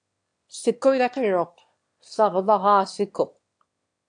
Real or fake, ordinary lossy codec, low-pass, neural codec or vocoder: fake; AAC, 64 kbps; 9.9 kHz; autoencoder, 22.05 kHz, a latent of 192 numbers a frame, VITS, trained on one speaker